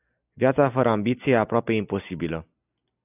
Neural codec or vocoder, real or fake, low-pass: none; real; 3.6 kHz